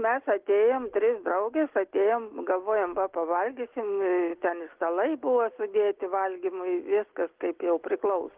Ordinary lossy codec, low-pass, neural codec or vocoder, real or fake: Opus, 16 kbps; 3.6 kHz; none; real